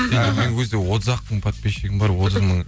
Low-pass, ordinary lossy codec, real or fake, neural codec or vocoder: none; none; real; none